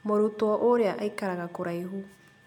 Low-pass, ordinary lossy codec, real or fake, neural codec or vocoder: 19.8 kHz; MP3, 96 kbps; real; none